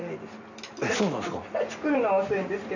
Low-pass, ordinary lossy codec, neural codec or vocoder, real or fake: 7.2 kHz; none; none; real